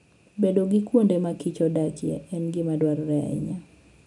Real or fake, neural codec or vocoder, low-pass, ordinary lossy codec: real; none; 10.8 kHz; none